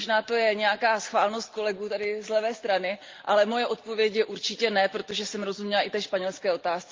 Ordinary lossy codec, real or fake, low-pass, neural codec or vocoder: Opus, 32 kbps; real; 7.2 kHz; none